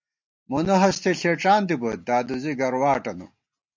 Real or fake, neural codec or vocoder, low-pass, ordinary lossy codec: real; none; 7.2 kHz; MP3, 48 kbps